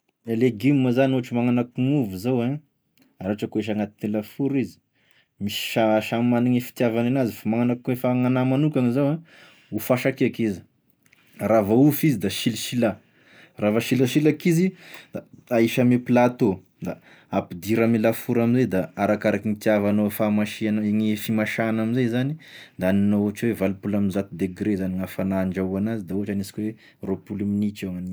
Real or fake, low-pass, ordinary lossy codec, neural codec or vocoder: real; none; none; none